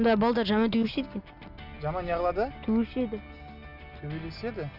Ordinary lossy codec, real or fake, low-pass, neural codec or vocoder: none; real; 5.4 kHz; none